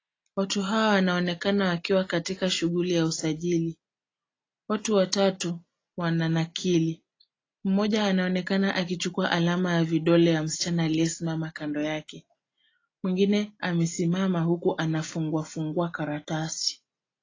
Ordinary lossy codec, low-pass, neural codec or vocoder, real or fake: AAC, 32 kbps; 7.2 kHz; none; real